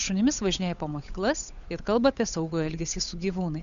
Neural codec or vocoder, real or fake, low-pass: codec, 16 kHz, 8 kbps, FunCodec, trained on Chinese and English, 25 frames a second; fake; 7.2 kHz